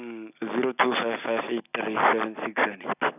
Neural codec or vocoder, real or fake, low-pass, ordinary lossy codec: none; real; 3.6 kHz; none